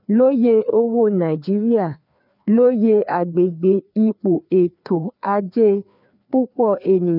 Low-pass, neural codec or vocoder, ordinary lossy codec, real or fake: 5.4 kHz; codec, 16 kHz, 2 kbps, FreqCodec, larger model; none; fake